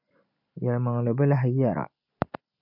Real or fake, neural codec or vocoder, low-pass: real; none; 5.4 kHz